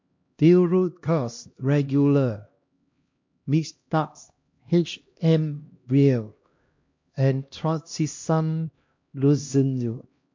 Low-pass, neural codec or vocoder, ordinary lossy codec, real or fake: 7.2 kHz; codec, 16 kHz, 1 kbps, X-Codec, HuBERT features, trained on LibriSpeech; MP3, 48 kbps; fake